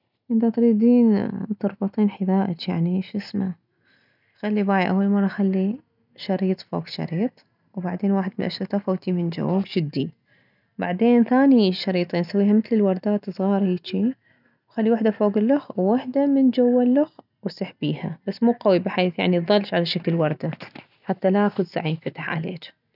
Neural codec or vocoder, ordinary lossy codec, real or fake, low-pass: none; none; real; 5.4 kHz